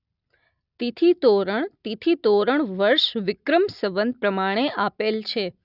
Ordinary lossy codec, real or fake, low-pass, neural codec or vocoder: none; real; 5.4 kHz; none